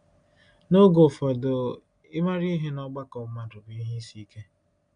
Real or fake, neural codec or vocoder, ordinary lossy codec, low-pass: real; none; none; 9.9 kHz